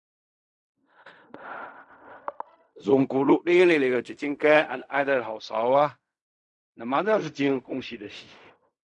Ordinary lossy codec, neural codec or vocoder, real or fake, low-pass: none; codec, 16 kHz in and 24 kHz out, 0.4 kbps, LongCat-Audio-Codec, fine tuned four codebook decoder; fake; 10.8 kHz